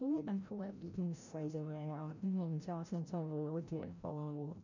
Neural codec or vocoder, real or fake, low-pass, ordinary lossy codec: codec, 16 kHz, 0.5 kbps, FreqCodec, larger model; fake; 7.2 kHz; none